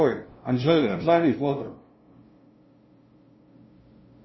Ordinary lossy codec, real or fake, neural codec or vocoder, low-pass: MP3, 24 kbps; fake; codec, 16 kHz, 0.5 kbps, FunCodec, trained on LibriTTS, 25 frames a second; 7.2 kHz